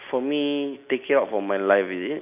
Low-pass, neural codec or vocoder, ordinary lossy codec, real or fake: 3.6 kHz; none; none; real